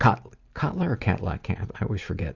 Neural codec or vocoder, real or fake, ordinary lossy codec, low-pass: none; real; Opus, 64 kbps; 7.2 kHz